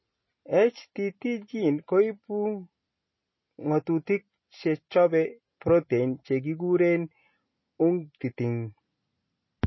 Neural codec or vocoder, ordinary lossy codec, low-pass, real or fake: none; MP3, 24 kbps; 7.2 kHz; real